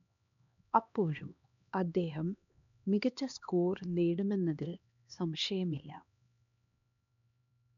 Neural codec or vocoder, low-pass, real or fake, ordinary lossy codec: codec, 16 kHz, 2 kbps, X-Codec, HuBERT features, trained on LibriSpeech; 7.2 kHz; fake; none